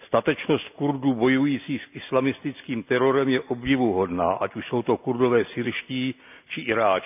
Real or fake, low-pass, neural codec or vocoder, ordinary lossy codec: real; 3.6 kHz; none; none